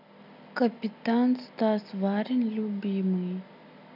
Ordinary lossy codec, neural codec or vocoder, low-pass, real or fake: none; none; 5.4 kHz; real